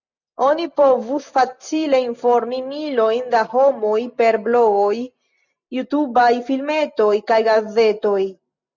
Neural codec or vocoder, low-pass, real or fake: none; 7.2 kHz; real